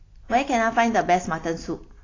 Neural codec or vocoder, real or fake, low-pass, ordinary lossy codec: none; real; 7.2 kHz; AAC, 32 kbps